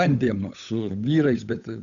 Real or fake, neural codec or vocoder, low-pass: fake; codec, 16 kHz, 8 kbps, FunCodec, trained on Chinese and English, 25 frames a second; 7.2 kHz